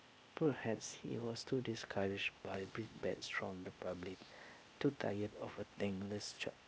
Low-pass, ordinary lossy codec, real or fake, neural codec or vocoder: none; none; fake; codec, 16 kHz, 0.8 kbps, ZipCodec